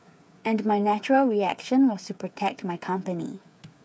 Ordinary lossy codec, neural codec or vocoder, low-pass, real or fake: none; codec, 16 kHz, 8 kbps, FreqCodec, smaller model; none; fake